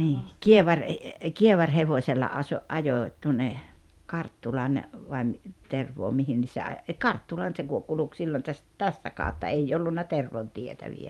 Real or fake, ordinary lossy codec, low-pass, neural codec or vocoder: fake; Opus, 32 kbps; 19.8 kHz; vocoder, 44.1 kHz, 128 mel bands every 512 samples, BigVGAN v2